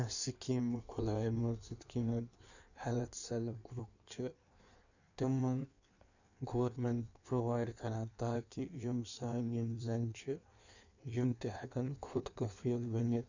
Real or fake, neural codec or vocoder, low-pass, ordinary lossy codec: fake; codec, 16 kHz in and 24 kHz out, 1.1 kbps, FireRedTTS-2 codec; 7.2 kHz; none